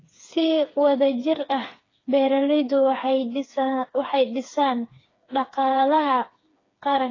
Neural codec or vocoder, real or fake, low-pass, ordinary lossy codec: codec, 16 kHz, 4 kbps, FreqCodec, smaller model; fake; 7.2 kHz; AAC, 32 kbps